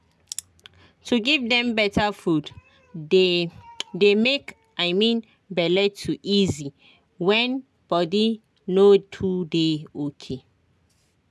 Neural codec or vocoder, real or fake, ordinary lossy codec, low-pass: none; real; none; none